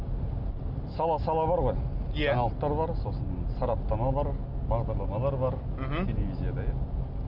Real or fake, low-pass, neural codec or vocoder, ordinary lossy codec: real; 5.4 kHz; none; none